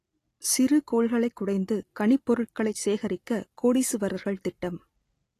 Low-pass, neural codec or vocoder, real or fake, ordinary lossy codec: 14.4 kHz; none; real; AAC, 48 kbps